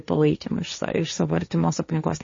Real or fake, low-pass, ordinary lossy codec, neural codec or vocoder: fake; 7.2 kHz; MP3, 32 kbps; codec, 16 kHz, 1.1 kbps, Voila-Tokenizer